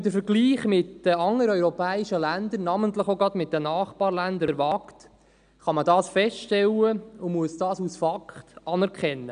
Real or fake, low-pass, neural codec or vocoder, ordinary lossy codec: real; 9.9 kHz; none; none